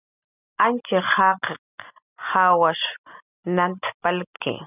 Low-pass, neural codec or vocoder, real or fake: 3.6 kHz; none; real